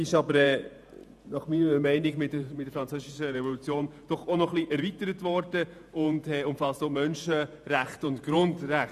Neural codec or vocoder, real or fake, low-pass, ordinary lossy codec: vocoder, 48 kHz, 128 mel bands, Vocos; fake; 14.4 kHz; none